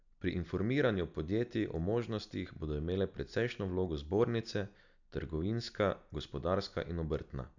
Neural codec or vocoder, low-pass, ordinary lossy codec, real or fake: none; 7.2 kHz; none; real